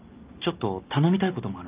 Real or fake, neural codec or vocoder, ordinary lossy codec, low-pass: real; none; Opus, 24 kbps; 3.6 kHz